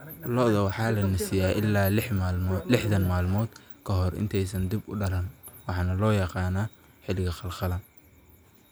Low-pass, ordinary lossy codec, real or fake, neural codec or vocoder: none; none; real; none